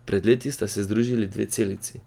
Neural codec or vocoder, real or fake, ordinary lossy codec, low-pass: none; real; Opus, 32 kbps; 19.8 kHz